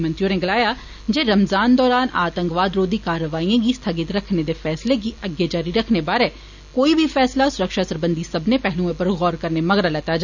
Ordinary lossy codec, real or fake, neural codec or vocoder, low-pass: none; real; none; 7.2 kHz